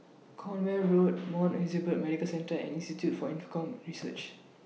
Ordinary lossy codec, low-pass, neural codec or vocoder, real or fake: none; none; none; real